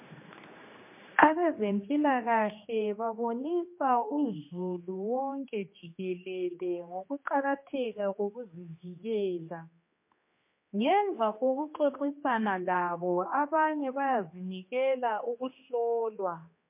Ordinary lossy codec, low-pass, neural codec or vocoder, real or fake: MP3, 24 kbps; 3.6 kHz; codec, 16 kHz, 2 kbps, X-Codec, HuBERT features, trained on general audio; fake